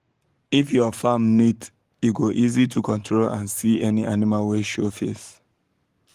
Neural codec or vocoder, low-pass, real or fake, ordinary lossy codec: codec, 44.1 kHz, 7.8 kbps, Pupu-Codec; 14.4 kHz; fake; Opus, 24 kbps